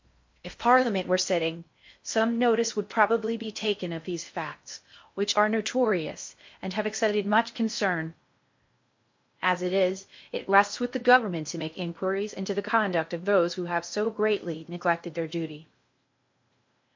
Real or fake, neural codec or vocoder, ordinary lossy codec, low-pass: fake; codec, 16 kHz in and 24 kHz out, 0.6 kbps, FocalCodec, streaming, 4096 codes; MP3, 48 kbps; 7.2 kHz